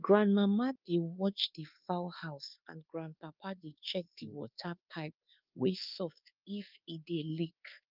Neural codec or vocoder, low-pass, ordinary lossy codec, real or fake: codec, 24 kHz, 1.2 kbps, DualCodec; 5.4 kHz; Opus, 24 kbps; fake